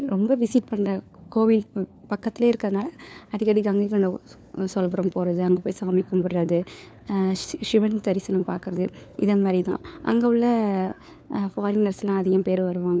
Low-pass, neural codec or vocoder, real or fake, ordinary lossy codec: none; codec, 16 kHz, 4 kbps, FunCodec, trained on LibriTTS, 50 frames a second; fake; none